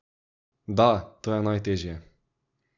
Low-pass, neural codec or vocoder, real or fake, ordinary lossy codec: 7.2 kHz; none; real; none